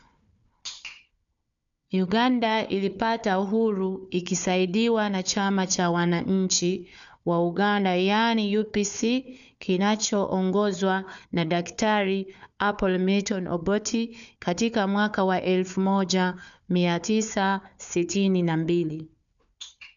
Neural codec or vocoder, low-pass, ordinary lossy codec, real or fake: codec, 16 kHz, 4 kbps, FunCodec, trained on Chinese and English, 50 frames a second; 7.2 kHz; none; fake